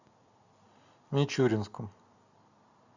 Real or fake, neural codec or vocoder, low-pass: real; none; 7.2 kHz